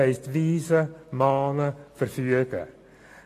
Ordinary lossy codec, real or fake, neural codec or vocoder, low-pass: AAC, 48 kbps; real; none; 14.4 kHz